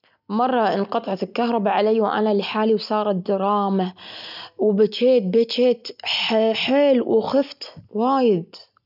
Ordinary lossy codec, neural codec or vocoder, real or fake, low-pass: none; none; real; 5.4 kHz